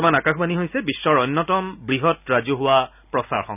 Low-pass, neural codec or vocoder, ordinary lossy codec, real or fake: 3.6 kHz; none; none; real